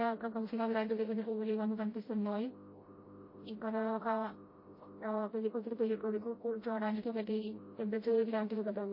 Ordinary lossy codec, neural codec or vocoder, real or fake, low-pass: MP3, 24 kbps; codec, 16 kHz, 0.5 kbps, FreqCodec, smaller model; fake; 5.4 kHz